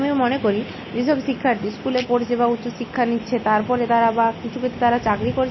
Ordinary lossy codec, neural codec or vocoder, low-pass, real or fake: MP3, 24 kbps; none; 7.2 kHz; real